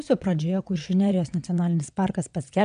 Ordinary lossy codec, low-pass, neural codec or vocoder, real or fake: AAC, 96 kbps; 9.9 kHz; vocoder, 22.05 kHz, 80 mel bands, WaveNeXt; fake